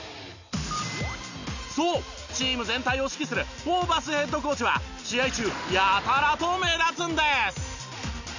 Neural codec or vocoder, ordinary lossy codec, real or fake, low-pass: none; none; real; 7.2 kHz